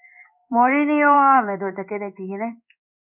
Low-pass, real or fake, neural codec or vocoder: 3.6 kHz; fake; codec, 16 kHz in and 24 kHz out, 1 kbps, XY-Tokenizer